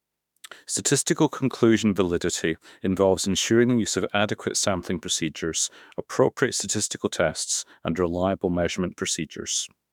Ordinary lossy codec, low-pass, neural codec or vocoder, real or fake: none; 19.8 kHz; autoencoder, 48 kHz, 32 numbers a frame, DAC-VAE, trained on Japanese speech; fake